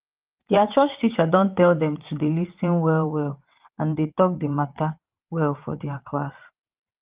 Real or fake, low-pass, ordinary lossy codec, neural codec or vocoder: fake; 3.6 kHz; Opus, 32 kbps; vocoder, 22.05 kHz, 80 mel bands, WaveNeXt